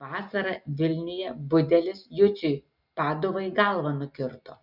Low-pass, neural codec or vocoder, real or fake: 5.4 kHz; none; real